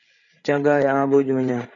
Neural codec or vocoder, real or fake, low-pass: codec, 16 kHz, 8 kbps, FreqCodec, larger model; fake; 7.2 kHz